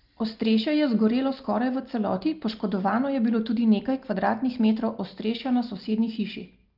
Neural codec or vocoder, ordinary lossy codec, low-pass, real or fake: none; Opus, 24 kbps; 5.4 kHz; real